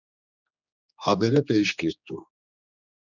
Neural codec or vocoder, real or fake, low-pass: codec, 16 kHz, 2 kbps, X-Codec, HuBERT features, trained on general audio; fake; 7.2 kHz